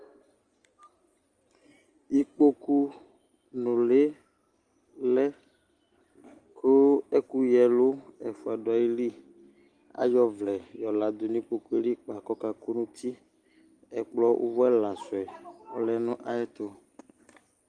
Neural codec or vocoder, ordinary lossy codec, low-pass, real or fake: none; Opus, 24 kbps; 9.9 kHz; real